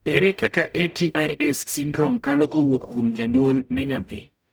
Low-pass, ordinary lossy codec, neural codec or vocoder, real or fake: none; none; codec, 44.1 kHz, 0.9 kbps, DAC; fake